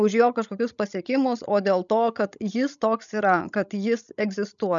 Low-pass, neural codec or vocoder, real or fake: 7.2 kHz; codec, 16 kHz, 16 kbps, FreqCodec, larger model; fake